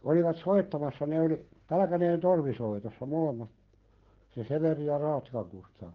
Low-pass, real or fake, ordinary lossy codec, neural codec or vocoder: 7.2 kHz; fake; Opus, 16 kbps; codec, 16 kHz, 16 kbps, FreqCodec, smaller model